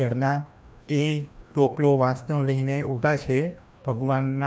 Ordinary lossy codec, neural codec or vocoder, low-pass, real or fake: none; codec, 16 kHz, 1 kbps, FreqCodec, larger model; none; fake